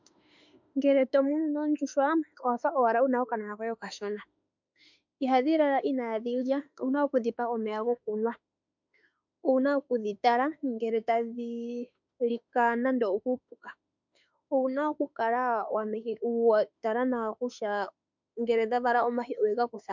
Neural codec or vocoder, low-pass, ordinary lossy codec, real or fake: autoencoder, 48 kHz, 32 numbers a frame, DAC-VAE, trained on Japanese speech; 7.2 kHz; MP3, 64 kbps; fake